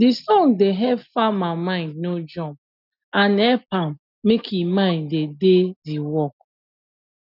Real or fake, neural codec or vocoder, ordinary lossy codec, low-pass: real; none; none; 5.4 kHz